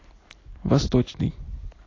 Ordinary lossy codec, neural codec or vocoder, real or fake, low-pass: AAC, 32 kbps; none; real; 7.2 kHz